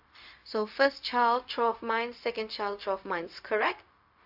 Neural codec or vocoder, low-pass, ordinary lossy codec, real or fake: codec, 16 kHz, 0.4 kbps, LongCat-Audio-Codec; 5.4 kHz; AAC, 48 kbps; fake